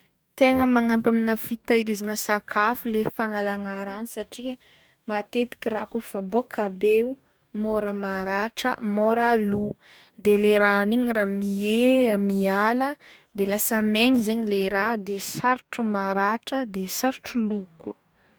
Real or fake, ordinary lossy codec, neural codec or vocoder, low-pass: fake; none; codec, 44.1 kHz, 2.6 kbps, DAC; none